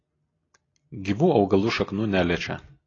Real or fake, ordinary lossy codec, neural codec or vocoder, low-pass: real; AAC, 32 kbps; none; 7.2 kHz